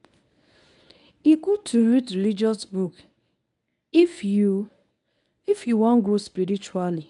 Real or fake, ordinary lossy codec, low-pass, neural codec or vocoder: fake; none; 10.8 kHz; codec, 24 kHz, 0.9 kbps, WavTokenizer, medium speech release version 1